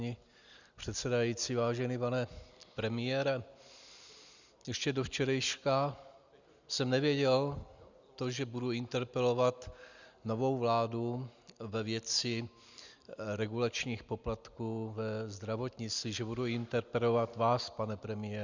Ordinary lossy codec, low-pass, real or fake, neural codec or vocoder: Opus, 64 kbps; 7.2 kHz; real; none